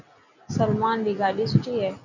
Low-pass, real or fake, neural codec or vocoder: 7.2 kHz; real; none